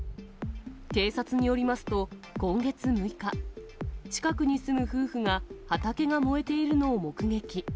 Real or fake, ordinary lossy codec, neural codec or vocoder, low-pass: real; none; none; none